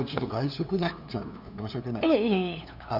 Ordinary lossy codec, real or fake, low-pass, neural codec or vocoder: none; fake; 5.4 kHz; codec, 16 kHz, 2 kbps, FunCodec, trained on LibriTTS, 25 frames a second